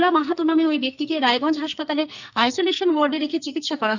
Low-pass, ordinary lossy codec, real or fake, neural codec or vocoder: 7.2 kHz; none; fake; codec, 44.1 kHz, 2.6 kbps, SNAC